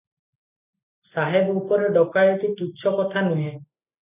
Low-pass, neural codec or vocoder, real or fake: 3.6 kHz; none; real